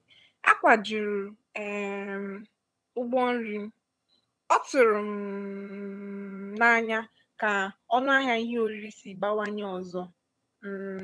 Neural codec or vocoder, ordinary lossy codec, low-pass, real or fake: vocoder, 22.05 kHz, 80 mel bands, HiFi-GAN; none; none; fake